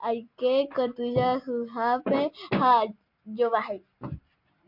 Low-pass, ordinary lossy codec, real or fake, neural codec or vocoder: 5.4 kHz; MP3, 48 kbps; real; none